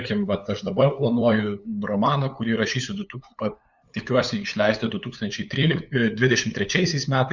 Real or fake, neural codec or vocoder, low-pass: fake; codec, 16 kHz, 8 kbps, FunCodec, trained on LibriTTS, 25 frames a second; 7.2 kHz